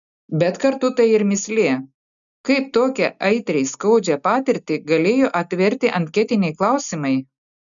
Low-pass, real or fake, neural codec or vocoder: 7.2 kHz; real; none